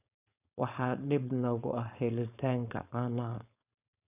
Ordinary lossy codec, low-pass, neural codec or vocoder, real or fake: none; 3.6 kHz; codec, 16 kHz, 4.8 kbps, FACodec; fake